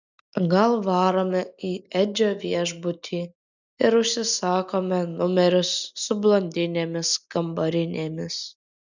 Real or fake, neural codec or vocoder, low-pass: real; none; 7.2 kHz